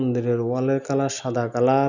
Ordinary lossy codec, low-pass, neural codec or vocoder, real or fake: none; 7.2 kHz; none; real